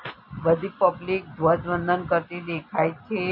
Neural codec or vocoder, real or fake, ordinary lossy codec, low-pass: none; real; MP3, 32 kbps; 5.4 kHz